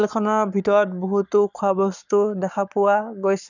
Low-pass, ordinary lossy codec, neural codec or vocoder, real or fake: 7.2 kHz; none; codec, 44.1 kHz, 7.8 kbps, Pupu-Codec; fake